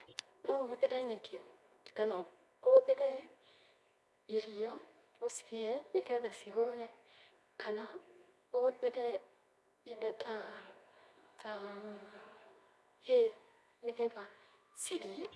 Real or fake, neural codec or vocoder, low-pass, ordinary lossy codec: fake; codec, 24 kHz, 0.9 kbps, WavTokenizer, medium music audio release; none; none